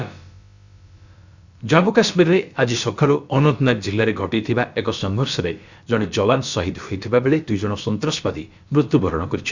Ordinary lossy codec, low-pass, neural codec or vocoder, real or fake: Opus, 64 kbps; 7.2 kHz; codec, 16 kHz, about 1 kbps, DyCAST, with the encoder's durations; fake